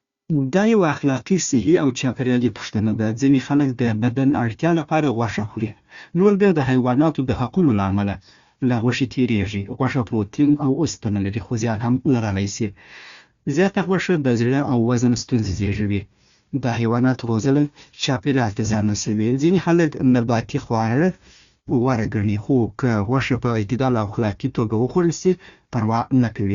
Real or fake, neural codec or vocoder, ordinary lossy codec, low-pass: fake; codec, 16 kHz, 1 kbps, FunCodec, trained on Chinese and English, 50 frames a second; Opus, 64 kbps; 7.2 kHz